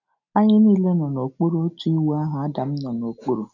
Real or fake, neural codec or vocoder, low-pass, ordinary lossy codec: real; none; 7.2 kHz; none